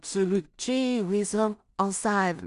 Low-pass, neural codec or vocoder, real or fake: 10.8 kHz; codec, 16 kHz in and 24 kHz out, 0.4 kbps, LongCat-Audio-Codec, two codebook decoder; fake